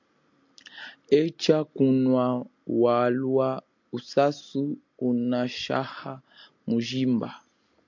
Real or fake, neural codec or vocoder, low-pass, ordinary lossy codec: real; none; 7.2 kHz; MP3, 64 kbps